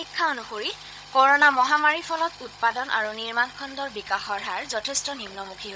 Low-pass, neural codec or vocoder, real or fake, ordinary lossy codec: none; codec, 16 kHz, 16 kbps, FunCodec, trained on Chinese and English, 50 frames a second; fake; none